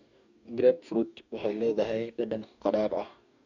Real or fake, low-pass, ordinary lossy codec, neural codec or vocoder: fake; 7.2 kHz; none; codec, 44.1 kHz, 2.6 kbps, DAC